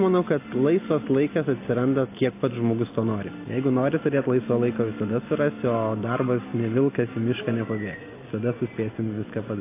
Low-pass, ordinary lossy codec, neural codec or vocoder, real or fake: 3.6 kHz; AAC, 24 kbps; none; real